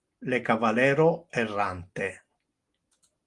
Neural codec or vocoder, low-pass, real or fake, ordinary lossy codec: none; 10.8 kHz; real; Opus, 24 kbps